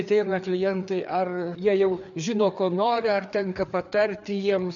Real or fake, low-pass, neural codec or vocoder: fake; 7.2 kHz; codec, 16 kHz, 4 kbps, FreqCodec, larger model